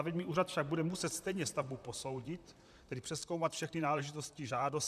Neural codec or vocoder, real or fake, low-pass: vocoder, 44.1 kHz, 128 mel bands, Pupu-Vocoder; fake; 14.4 kHz